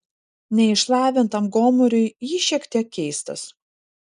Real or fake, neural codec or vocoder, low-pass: real; none; 10.8 kHz